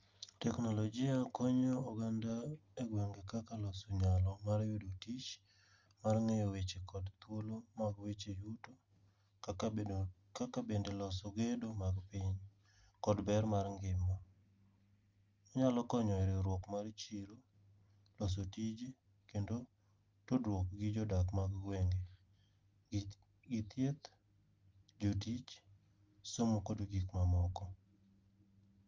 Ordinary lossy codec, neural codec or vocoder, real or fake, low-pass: Opus, 32 kbps; none; real; 7.2 kHz